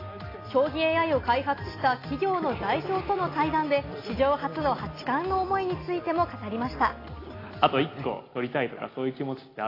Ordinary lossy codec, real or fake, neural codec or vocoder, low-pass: AAC, 24 kbps; real; none; 5.4 kHz